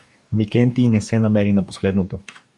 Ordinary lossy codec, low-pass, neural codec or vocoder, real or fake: MP3, 96 kbps; 10.8 kHz; codec, 44.1 kHz, 2.6 kbps, DAC; fake